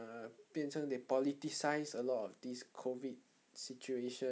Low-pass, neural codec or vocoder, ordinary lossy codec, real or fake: none; none; none; real